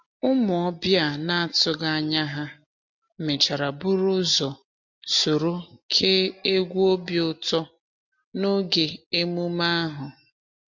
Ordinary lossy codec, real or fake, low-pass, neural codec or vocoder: MP3, 48 kbps; real; 7.2 kHz; none